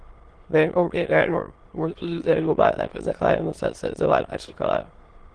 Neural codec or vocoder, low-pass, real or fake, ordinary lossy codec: autoencoder, 22.05 kHz, a latent of 192 numbers a frame, VITS, trained on many speakers; 9.9 kHz; fake; Opus, 16 kbps